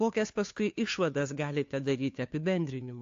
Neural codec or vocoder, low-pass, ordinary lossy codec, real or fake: codec, 16 kHz, 2 kbps, FunCodec, trained on Chinese and English, 25 frames a second; 7.2 kHz; AAC, 48 kbps; fake